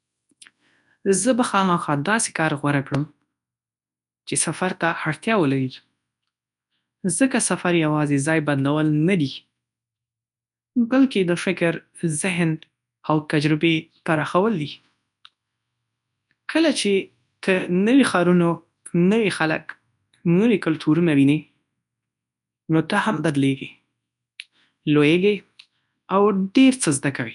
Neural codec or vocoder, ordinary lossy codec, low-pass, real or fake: codec, 24 kHz, 0.9 kbps, WavTokenizer, large speech release; none; 10.8 kHz; fake